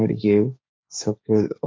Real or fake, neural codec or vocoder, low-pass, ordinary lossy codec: fake; codec, 16 kHz, 1.1 kbps, Voila-Tokenizer; none; none